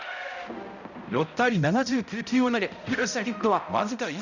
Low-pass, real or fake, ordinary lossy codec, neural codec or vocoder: 7.2 kHz; fake; none; codec, 16 kHz, 0.5 kbps, X-Codec, HuBERT features, trained on balanced general audio